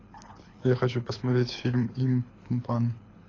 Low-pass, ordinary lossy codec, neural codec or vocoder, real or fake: 7.2 kHz; AAC, 32 kbps; codec, 24 kHz, 6 kbps, HILCodec; fake